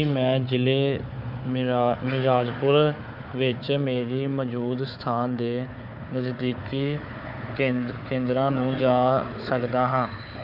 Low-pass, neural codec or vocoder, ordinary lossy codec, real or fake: 5.4 kHz; codec, 16 kHz, 4 kbps, FunCodec, trained on Chinese and English, 50 frames a second; none; fake